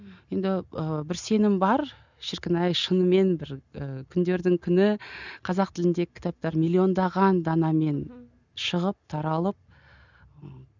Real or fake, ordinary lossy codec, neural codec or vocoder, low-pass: real; none; none; 7.2 kHz